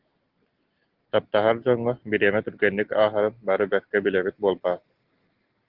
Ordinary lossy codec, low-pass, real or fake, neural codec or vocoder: Opus, 16 kbps; 5.4 kHz; real; none